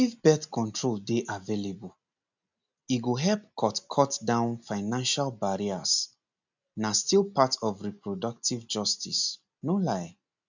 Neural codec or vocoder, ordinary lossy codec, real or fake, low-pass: none; none; real; 7.2 kHz